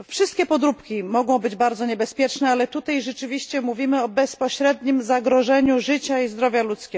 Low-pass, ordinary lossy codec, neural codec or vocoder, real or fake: none; none; none; real